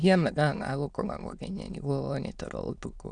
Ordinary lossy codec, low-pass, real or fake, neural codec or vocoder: none; 9.9 kHz; fake; autoencoder, 22.05 kHz, a latent of 192 numbers a frame, VITS, trained on many speakers